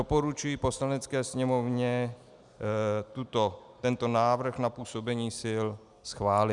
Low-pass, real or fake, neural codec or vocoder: 10.8 kHz; real; none